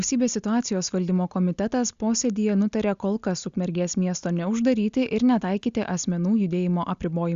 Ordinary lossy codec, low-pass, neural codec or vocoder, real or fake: Opus, 64 kbps; 7.2 kHz; none; real